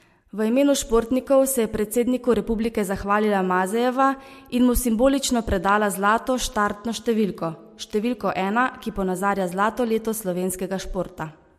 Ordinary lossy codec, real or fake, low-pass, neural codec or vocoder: MP3, 64 kbps; real; 14.4 kHz; none